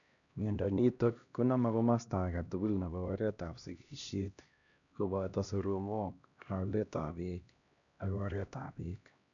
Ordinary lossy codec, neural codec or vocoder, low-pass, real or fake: none; codec, 16 kHz, 1 kbps, X-Codec, HuBERT features, trained on LibriSpeech; 7.2 kHz; fake